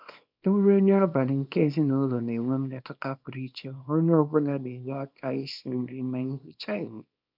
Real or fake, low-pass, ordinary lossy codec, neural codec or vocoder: fake; 5.4 kHz; none; codec, 24 kHz, 0.9 kbps, WavTokenizer, small release